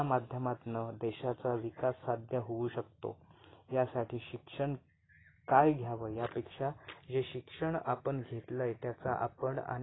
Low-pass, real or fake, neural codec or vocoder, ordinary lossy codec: 7.2 kHz; real; none; AAC, 16 kbps